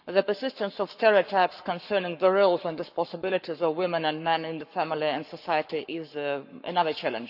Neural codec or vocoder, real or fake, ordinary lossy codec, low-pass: codec, 44.1 kHz, 7.8 kbps, Pupu-Codec; fake; none; 5.4 kHz